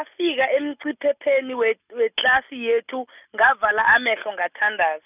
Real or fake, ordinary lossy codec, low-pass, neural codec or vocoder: real; none; 3.6 kHz; none